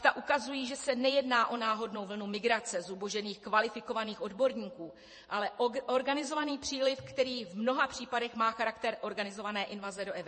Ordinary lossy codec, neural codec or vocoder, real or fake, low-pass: MP3, 32 kbps; vocoder, 48 kHz, 128 mel bands, Vocos; fake; 10.8 kHz